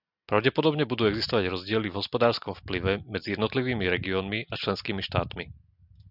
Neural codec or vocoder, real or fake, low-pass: none; real; 5.4 kHz